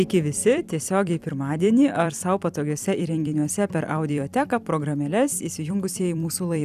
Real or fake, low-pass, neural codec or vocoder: fake; 14.4 kHz; vocoder, 44.1 kHz, 128 mel bands every 256 samples, BigVGAN v2